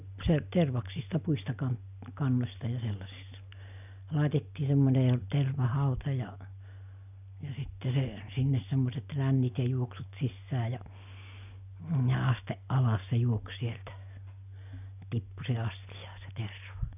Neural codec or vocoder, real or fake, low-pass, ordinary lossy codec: none; real; 3.6 kHz; none